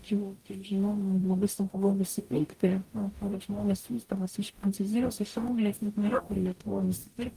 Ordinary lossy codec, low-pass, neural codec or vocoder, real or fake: Opus, 32 kbps; 14.4 kHz; codec, 44.1 kHz, 0.9 kbps, DAC; fake